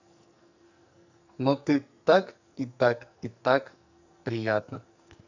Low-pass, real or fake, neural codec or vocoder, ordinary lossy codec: 7.2 kHz; fake; codec, 44.1 kHz, 2.6 kbps, SNAC; none